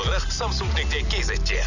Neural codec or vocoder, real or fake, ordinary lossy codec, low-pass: none; real; none; 7.2 kHz